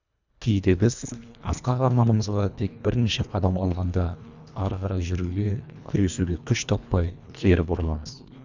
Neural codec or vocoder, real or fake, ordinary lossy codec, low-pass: codec, 24 kHz, 1.5 kbps, HILCodec; fake; none; 7.2 kHz